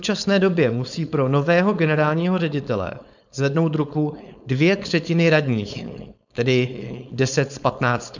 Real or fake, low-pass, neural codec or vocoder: fake; 7.2 kHz; codec, 16 kHz, 4.8 kbps, FACodec